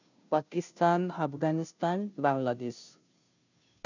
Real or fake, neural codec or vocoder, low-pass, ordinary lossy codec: fake; codec, 16 kHz, 0.5 kbps, FunCodec, trained on Chinese and English, 25 frames a second; 7.2 kHz; none